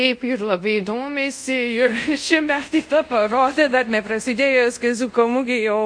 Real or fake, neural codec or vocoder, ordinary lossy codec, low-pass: fake; codec, 24 kHz, 0.5 kbps, DualCodec; MP3, 48 kbps; 9.9 kHz